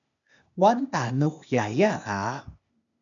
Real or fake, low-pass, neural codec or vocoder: fake; 7.2 kHz; codec, 16 kHz, 0.8 kbps, ZipCodec